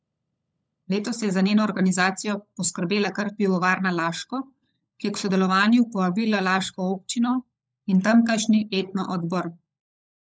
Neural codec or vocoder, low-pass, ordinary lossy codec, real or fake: codec, 16 kHz, 16 kbps, FunCodec, trained on LibriTTS, 50 frames a second; none; none; fake